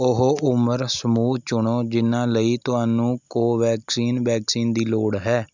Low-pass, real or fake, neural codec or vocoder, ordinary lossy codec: 7.2 kHz; real; none; none